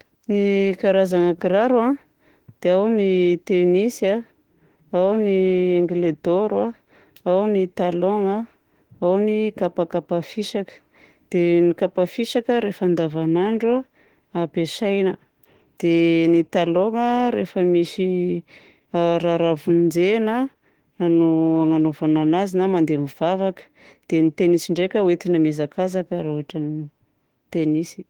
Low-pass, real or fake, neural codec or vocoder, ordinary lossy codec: 19.8 kHz; fake; autoencoder, 48 kHz, 32 numbers a frame, DAC-VAE, trained on Japanese speech; Opus, 16 kbps